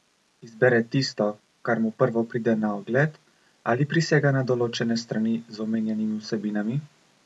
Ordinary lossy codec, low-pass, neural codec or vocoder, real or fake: none; none; none; real